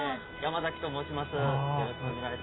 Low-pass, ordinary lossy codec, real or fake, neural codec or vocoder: 7.2 kHz; AAC, 16 kbps; real; none